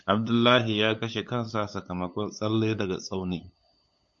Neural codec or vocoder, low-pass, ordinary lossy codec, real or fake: codec, 16 kHz, 8 kbps, FunCodec, trained on LibriTTS, 25 frames a second; 7.2 kHz; MP3, 48 kbps; fake